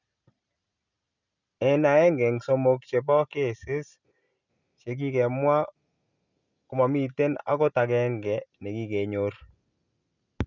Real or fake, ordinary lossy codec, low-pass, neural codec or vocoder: real; none; 7.2 kHz; none